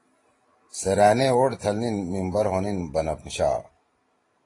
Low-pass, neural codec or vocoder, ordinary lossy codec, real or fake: 10.8 kHz; vocoder, 44.1 kHz, 128 mel bands every 512 samples, BigVGAN v2; AAC, 32 kbps; fake